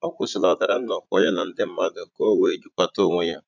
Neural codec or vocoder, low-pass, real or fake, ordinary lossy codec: vocoder, 44.1 kHz, 80 mel bands, Vocos; 7.2 kHz; fake; none